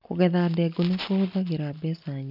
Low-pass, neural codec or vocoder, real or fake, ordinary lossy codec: 5.4 kHz; none; real; none